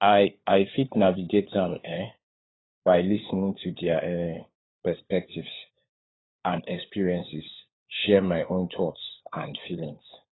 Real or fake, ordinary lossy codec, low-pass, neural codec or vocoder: fake; AAC, 16 kbps; 7.2 kHz; codec, 16 kHz, 4 kbps, FunCodec, trained on LibriTTS, 50 frames a second